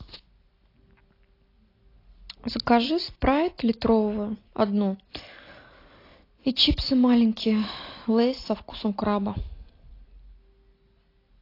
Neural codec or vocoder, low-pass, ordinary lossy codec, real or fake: none; 5.4 kHz; AAC, 32 kbps; real